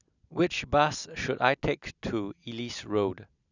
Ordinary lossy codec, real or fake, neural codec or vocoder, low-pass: none; real; none; 7.2 kHz